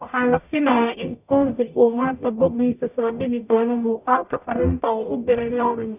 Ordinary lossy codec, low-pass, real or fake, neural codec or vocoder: none; 3.6 kHz; fake; codec, 44.1 kHz, 0.9 kbps, DAC